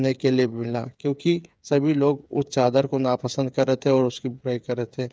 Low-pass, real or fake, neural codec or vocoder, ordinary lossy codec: none; fake; codec, 16 kHz, 8 kbps, FreqCodec, smaller model; none